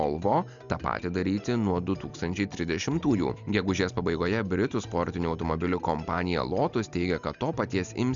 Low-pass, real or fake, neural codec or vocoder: 7.2 kHz; real; none